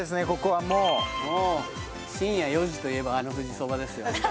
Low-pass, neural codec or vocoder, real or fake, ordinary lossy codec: none; none; real; none